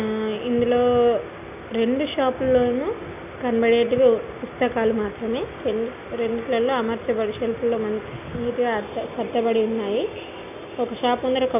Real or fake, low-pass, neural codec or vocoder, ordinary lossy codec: real; 3.6 kHz; none; none